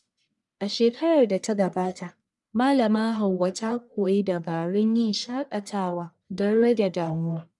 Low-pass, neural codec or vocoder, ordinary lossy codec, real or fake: 10.8 kHz; codec, 44.1 kHz, 1.7 kbps, Pupu-Codec; none; fake